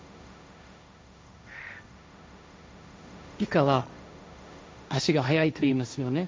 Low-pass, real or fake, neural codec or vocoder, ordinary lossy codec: none; fake; codec, 16 kHz, 1.1 kbps, Voila-Tokenizer; none